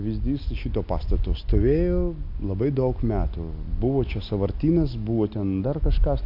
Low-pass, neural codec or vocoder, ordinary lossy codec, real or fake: 5.4 kHz; none; MP3, 48 kbps; real